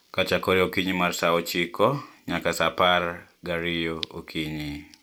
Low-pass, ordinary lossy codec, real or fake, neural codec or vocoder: none; none; real; none